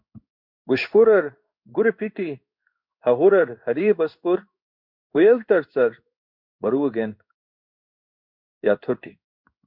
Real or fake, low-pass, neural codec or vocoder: fake; 5.4 kHz; codec, 16 kHz in and 24 kHz out, 1 kbps, XY-Tokenizer